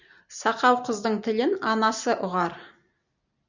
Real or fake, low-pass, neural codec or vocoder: real; 7.2 kHz; none